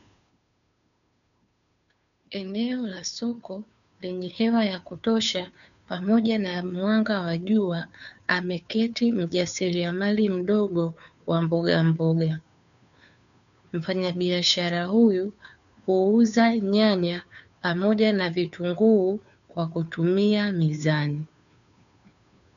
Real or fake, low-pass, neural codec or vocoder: fake; 7.2 kHz; codec, 16 kHz, 2 kbps, FunCodec, trained on Chinese and English, 25 frames a second